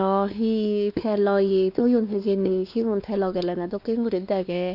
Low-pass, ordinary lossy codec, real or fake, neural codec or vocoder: 5.4 kHz; none; fake; codec, 16 kHz, 2 kbps, X-Codec, WavLM features, trained on Multilingual LibriSpeech